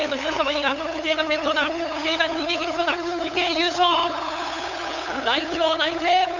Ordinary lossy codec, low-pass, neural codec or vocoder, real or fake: none; 7.2 kHz; codec, 16 kHz, 8 kbps, FunCodec, trained on LibriTTS, 25 frames a second; fake